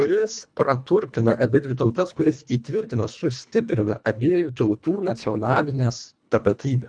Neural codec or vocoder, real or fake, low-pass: codec, 24 kHz, 1.5 kbps, HILCodec; fake; 9.9 kHz